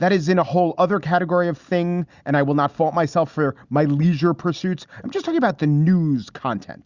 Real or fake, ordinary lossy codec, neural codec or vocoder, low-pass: real; Opus, 64 kbps; none; 7.2 kHz